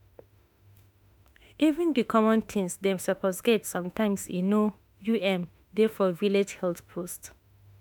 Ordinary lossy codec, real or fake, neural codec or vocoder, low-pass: none; fake; autoencoder, 48 kHz, 32 numbers a frame, DAC-VAE, trained on Japanese speech; 19.8 kHz